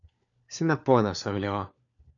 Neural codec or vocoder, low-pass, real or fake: codec, 16 kHz, 4 kbps, FunCodec, trained on LibriTTS, 50 frames a second; 7.2 kHz; fake